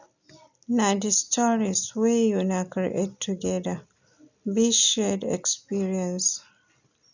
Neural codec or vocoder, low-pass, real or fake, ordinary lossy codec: none; 7.2 kHz; real; none